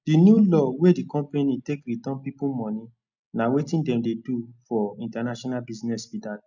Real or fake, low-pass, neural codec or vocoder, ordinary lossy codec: real; 7.2 kHz; none; none